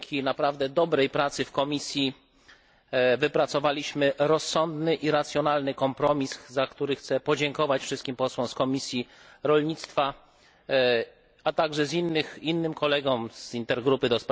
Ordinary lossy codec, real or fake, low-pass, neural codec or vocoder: none; real; none; none